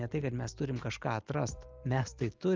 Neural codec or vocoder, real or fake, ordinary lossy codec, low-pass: none; real; Opus, 24 kbps; 7.2 kHz